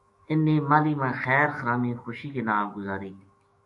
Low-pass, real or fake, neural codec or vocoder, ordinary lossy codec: 10.8 kHz; fake; autoencoder, 48 kHz, 128 numbers a frame, DAC-VAE, trained on Japanese speech; MP3, 64 kbps